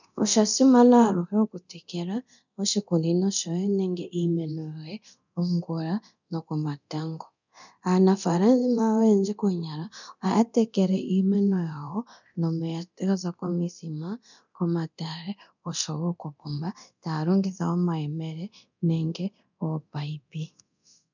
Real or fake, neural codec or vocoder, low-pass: fake; codec, 24 kHz, 0.9 kbps, DualCodec; 7.2 kHz